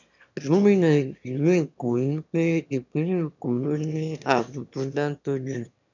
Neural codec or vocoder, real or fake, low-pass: autoencoder, 22.05 kHz, a latent of 192 numbers a frame, VITS, trained on one speaker; fake; 7.2 kHz